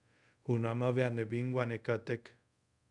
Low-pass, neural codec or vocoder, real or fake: 10.8 kHz; codec, 24 kHz, 0.5 kbps, DualCodec; fake